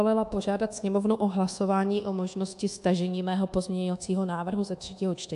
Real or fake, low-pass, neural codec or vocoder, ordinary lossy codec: fake; 10.8 kHz; codec, 24 kHz, 1.2 kbps, DualCodec; AAC, 64 kbps